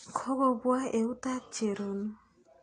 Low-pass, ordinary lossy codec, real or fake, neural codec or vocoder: 9.9 kHz; AAC, 32 kbps; real; none